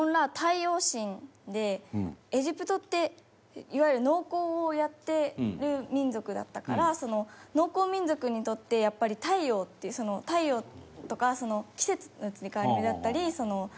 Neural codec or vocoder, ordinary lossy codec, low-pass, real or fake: none; none; none; real